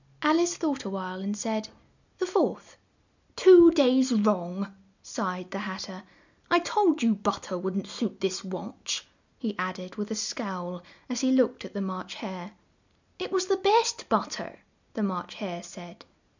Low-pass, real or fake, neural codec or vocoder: 7.2 kHz; real; none